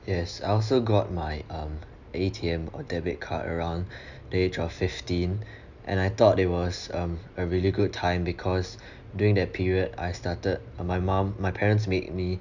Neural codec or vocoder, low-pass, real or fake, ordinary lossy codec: none; 7.2 kHz; real; none